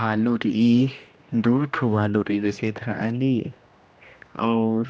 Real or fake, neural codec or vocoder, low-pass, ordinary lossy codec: fake; codec, 16 kHz, 1 kbps, X-Codec, HuBERT features, trained on general audio; none; none